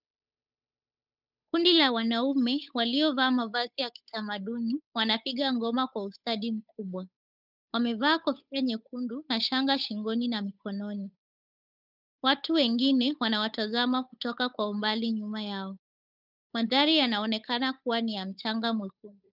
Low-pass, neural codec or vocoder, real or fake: 5.4 kHz; codec, 16 kHz, 8 kbps, FunCodec, trained on Chinese and English, 25 frames a second; fake